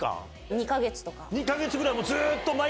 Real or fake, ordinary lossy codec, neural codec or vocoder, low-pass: real; none; none; none